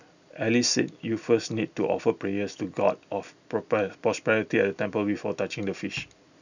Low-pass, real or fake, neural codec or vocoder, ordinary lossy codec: 7.2 kHz; real; none; none